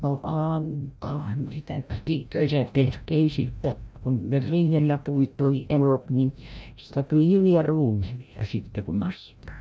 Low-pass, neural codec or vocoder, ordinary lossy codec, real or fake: none; codec, 16 kHz, 0.5 kbps, FreqCodec, larger model; none; fake